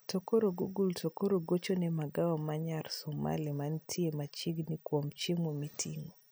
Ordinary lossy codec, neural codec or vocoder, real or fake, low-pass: none; none; real; none